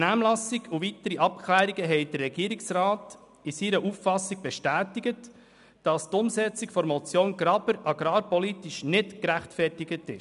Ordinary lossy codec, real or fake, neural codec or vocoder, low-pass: none; real; none; 10.8 kHz